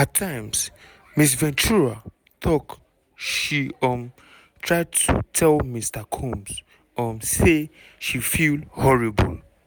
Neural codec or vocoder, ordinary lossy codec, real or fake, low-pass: none; none; real; none